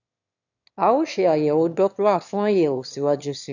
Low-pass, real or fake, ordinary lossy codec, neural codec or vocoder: 7.2 kHz; fake; none; autoencoder, 22.05 kHz, a latent of 192 numbers a frame, VITS, trained on one speaker